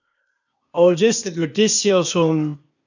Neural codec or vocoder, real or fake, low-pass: codec, 16 kHz, 0.8 kbps, ZipCodec; fake; 7.2 kHz